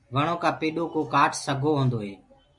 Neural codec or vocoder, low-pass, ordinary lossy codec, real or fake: none; 10.8 kHz; MP3, 48 kbps; real